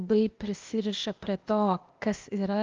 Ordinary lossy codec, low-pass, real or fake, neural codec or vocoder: Opus, 32 kbps; 7.2 kHz; fake; codec, 16 kHz, 0.8 kbps, ZipCodec